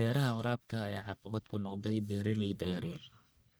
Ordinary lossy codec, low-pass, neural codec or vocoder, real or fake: none; none; codec, 44.1 kHz, 1.7 kbps, Pupu-Codec; fake